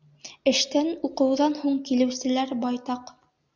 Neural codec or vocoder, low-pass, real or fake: none; 7.2 kHz; real